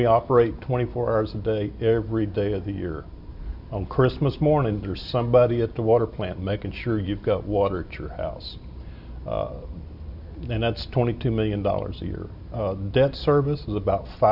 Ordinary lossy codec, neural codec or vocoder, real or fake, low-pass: AAC, 48 kbps; none; real; 5.4 kHz